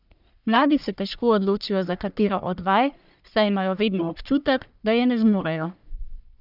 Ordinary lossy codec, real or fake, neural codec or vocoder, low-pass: none; fake; codec, 44.1 kHz, 1.7 kbps, Pupu-Codec; 5.4 kHz